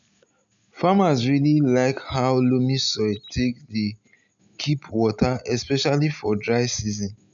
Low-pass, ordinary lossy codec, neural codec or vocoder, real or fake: 7.2 kHz; none; none; real